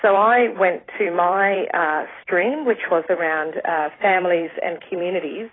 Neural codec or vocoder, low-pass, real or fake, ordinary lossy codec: vocoder, 44.1 kHz, 128 mel bands every 256 samples, BigVGAN v2; 7.2 kHz; fake; AAC, 16 kbps